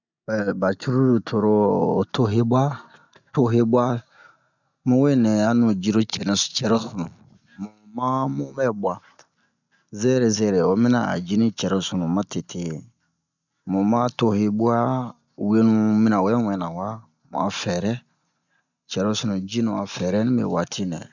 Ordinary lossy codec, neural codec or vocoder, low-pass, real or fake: none; none; 7.2 kHz; real